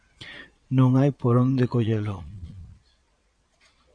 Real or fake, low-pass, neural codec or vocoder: fake; 9.9 kHz; vocoder, 22.05 kHz, 80 mel bands, Vocos